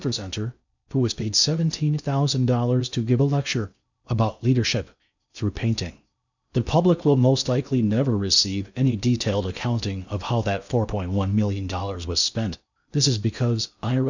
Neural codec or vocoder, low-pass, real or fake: codec, 16 kHz in and 24 kHz out, 0.6 kbps, FocalCodec, streaming, 2048 codes; 7.2 kHz; fake